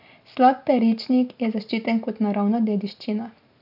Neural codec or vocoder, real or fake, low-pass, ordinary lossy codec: none; real; 5.4 kHz; MP3, 48 kbps